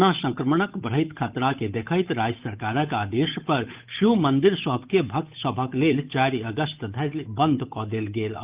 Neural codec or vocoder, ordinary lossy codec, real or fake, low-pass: codec, 16 kHz, 8 kbps, FunCodec, trained on Chinese and English, 25 frames a second; Opus, 24 kbps; fake; 3.6 kHz